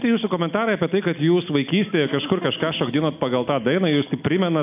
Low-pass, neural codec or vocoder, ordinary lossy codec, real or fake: 3.6 kHz; none; AAC, 32 kbps; real